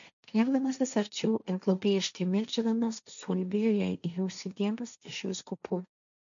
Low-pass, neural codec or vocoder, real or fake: 7.2 kHz; codec, 16 kHz, 1.1 kbps, Voila-Tokenizer; fake